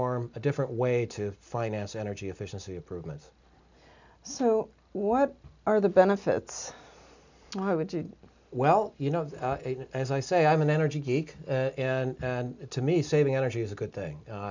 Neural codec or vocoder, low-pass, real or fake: none; 7.2 kHz; real